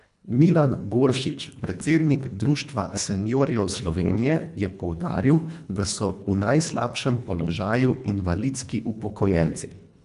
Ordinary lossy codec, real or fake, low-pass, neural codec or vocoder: none; fake; 10.8 kHz; codec, 24 kHz, 1.5 kbps, HILCodec